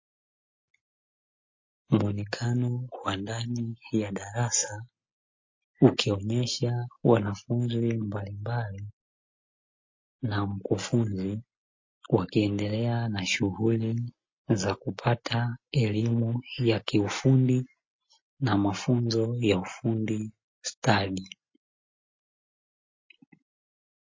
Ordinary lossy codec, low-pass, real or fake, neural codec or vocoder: MP3, 32 kbps; 7.2 kHz; real; none